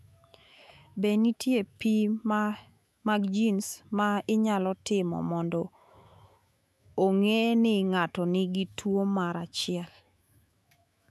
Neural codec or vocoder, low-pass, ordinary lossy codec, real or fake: autoencoder, 48 kHz, 128 numbers a frame, DAC-VAE, trained on Japanese speech; 14.4 kHz; none; fake